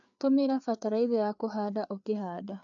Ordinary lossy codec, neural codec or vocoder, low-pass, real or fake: AAC, 64 kbps; codec, 16 kHz, 4 kbps, FreqCodec, larger model; 7.2 kHz; fake